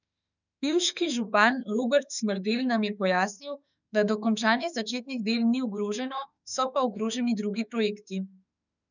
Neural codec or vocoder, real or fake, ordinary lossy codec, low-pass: autoencoder, 48 kHz, 32 numbers a frame, DAC-VAE, trained on Japanese speech; fake; none; 7.2 kHz